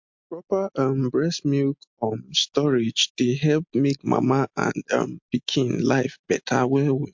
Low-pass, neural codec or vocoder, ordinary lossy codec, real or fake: 7.2 kHz; none; MP3, 48 kbps; real